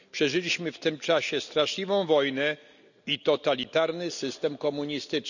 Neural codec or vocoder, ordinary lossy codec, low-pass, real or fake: none; none; 7.2 kHz; real